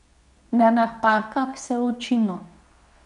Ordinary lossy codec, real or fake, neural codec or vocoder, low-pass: none; fake; codec, 24 kHz, 0.9 kbps, WavTokenizer, medium speech release version 2; 10.8 kHz